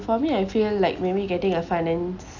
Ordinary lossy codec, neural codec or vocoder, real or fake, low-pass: none; none; real; 7.2 kHz